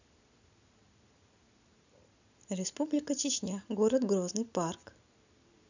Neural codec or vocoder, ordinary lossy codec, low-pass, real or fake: none; none; 7.2 kHz; real